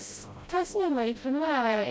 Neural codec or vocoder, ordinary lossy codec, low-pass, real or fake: codec, 16 kHz, 0.5 kbps, FreqCodec, smaller model; none; none; fake